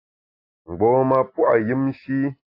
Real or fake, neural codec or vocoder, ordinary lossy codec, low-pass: real; none; AAC, 32 kbps; 5.4 kHz